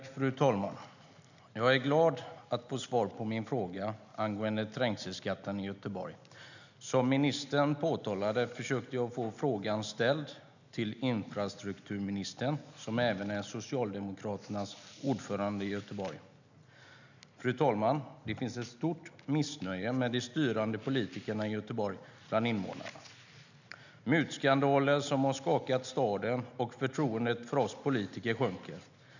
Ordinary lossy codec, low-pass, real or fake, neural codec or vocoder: none; 7.2 kHz; real; none